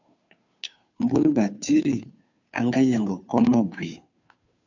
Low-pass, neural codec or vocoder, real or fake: 7.2 kHz; codec, 16 kHz, 2 kbps, FunCodec, trained on Chinese and English, 25 frames a second; fake